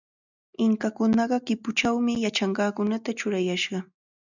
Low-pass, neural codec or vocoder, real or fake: 7.2 kHz; none; real